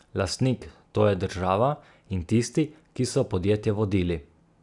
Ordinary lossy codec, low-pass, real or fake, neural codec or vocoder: none; 10.8 kHz; real; none